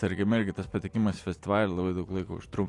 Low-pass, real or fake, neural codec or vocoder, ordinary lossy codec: 10.8 kHz; real; none; AAC, 48 kbps